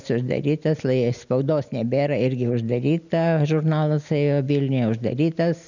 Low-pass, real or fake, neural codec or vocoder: 7.2 kHz; real; none